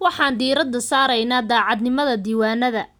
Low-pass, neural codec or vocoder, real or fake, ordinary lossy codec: 19.8 kHz; none; real; none